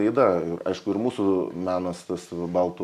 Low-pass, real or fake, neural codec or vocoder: 14.4 kHz; real; none